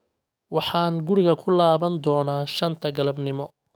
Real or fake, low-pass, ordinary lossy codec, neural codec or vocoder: fake; none; none; codec, 44.1 kHz, 7.8 kbps, DAC